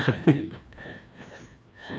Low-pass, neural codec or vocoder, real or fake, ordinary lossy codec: none; codec, 16 kHz, 1 kbps, FreqCodec, larger model; fake; none